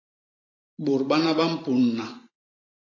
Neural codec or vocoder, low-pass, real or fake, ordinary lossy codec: vocoder, 44.1 kHz, 128 mel bands every 512 samples, BigVGAN v2; 7.2 kHz; fake; AAC, 32 kbps